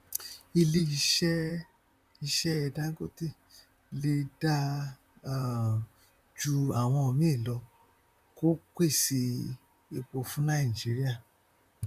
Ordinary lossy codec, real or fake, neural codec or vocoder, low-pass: none; fake; vocoder, 44.1 kHz, 128 mel bands, Pupu-Vocoder; 14.4 kHz